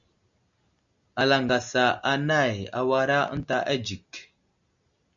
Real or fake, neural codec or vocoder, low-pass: real; none; 7.2 kHz